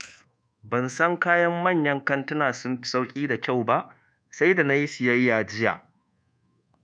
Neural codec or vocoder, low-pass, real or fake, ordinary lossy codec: codec, 24 kHz, 1.2 kbps, DualCodec; 9.9 kHz; fake; none